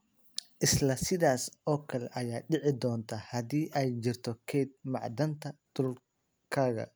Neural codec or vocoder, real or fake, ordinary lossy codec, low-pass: none; real; none; none